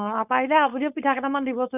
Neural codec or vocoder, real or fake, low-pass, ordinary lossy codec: codec, 16 kHz, 4.8 kbps, FACodec; fake; 3.6 kHz; none